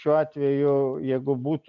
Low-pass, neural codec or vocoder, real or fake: 7.2 kHz; none; real